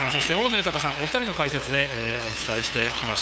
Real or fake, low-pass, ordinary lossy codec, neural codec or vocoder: fake; none; none; codec, 16 kHz, 2 kbps, FunCodec, trained on LibriTTS, 25 frames a second